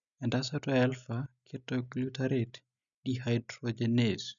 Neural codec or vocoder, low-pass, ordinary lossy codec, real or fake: none; 7.2 kHz; none; real